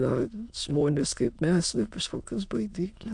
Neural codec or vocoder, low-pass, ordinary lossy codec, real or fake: autoencoder, 22.05 kHz, a latent of 192 numbers a frame, VITS, trained on many speakers; 9.9 kHz; AAC, 64 kbps; fake